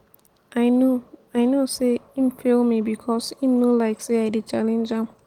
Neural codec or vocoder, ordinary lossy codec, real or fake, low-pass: none; Opus, 24 kbps; real; 19.8 kHz